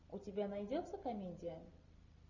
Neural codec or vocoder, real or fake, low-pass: none; real; 7.2 kHz